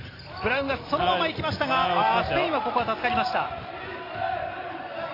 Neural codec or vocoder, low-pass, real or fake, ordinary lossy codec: none; 5.4 kHz; real; AAC, 24 kbps